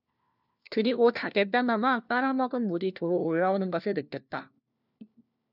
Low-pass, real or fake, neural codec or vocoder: 5.4 kHz; fake; codec, 16 kHz, 1 kbps, FunCodec, trained on LibriTTS, 50 frames a second